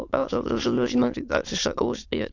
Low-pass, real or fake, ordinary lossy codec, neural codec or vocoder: 7.2 kHz; fake; AAC, 48 kbps; autoencoder, 22.05 kHz, a latent of 192 numbers a frame, VITS, trained on many speakers